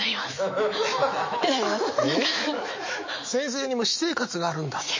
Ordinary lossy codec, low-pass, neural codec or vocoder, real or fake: MP3, 32 kbps; 7.2 kHz; autoencoder, 48 kHz, 128 numbers a frame, DAC-VAE, trained on Japanese speech; fake